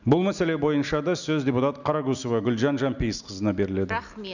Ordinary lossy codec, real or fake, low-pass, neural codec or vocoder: none; real; 7.2 kHz; none